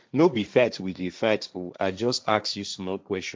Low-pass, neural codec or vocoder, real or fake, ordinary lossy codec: none; codec, 16 kHz, 1.1 kbps, Voila-Tokenizer; fake; none